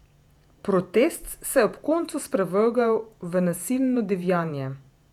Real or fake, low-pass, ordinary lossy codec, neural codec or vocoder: fake; 19.8 kHz; none; vocoder, 48 kHz, 128 mel bands, Vocos